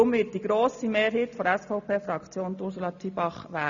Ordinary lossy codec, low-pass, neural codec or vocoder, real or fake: none; 7.2 kHz; none; real